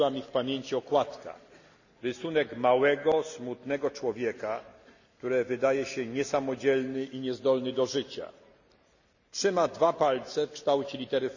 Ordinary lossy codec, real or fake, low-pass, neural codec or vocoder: none; real; 7.2 kHz; none